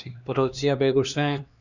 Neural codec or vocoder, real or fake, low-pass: codec, 16 kHz, 2 kbps, X-Codec, HuBERT features, trained on LibriSpeech; fake; 7.2 kHz